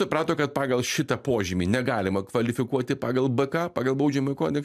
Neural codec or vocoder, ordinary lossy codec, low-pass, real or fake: none; AAC, 96 kbps; 14.4 kHz; real